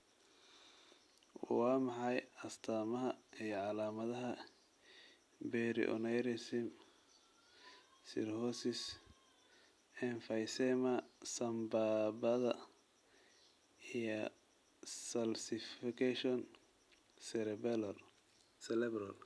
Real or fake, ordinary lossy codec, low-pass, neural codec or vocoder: real; none; none; none